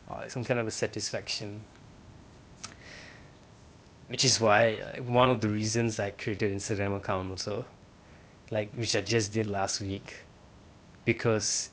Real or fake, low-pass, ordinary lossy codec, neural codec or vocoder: fake; none; none; codec, 16 kHz, 0.8 kbps, ZipCodec